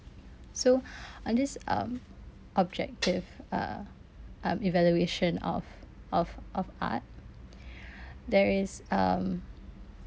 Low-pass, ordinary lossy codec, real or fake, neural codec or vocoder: none; none; real; none